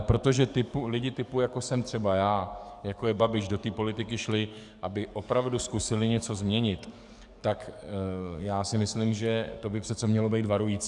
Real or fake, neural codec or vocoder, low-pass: fake; codec, 44.1 kHz, 7.8 kbps, DAC; 10.8 kHz